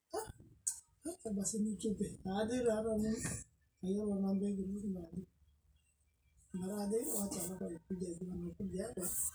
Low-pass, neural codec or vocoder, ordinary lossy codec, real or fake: none; none; none; real